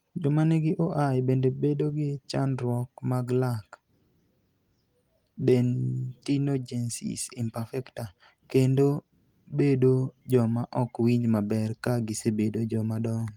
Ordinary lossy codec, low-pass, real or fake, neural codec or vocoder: Opus, 32 kbps; 19.8 kHz; real; none